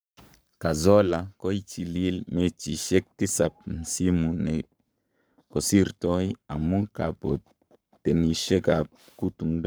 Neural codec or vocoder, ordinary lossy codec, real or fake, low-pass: codec, 44.1 kHz, 7.8 kbps, Pupu-Codec; none; fake; none